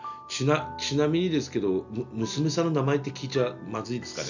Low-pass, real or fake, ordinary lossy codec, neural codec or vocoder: 7.2 kHz; real; none; none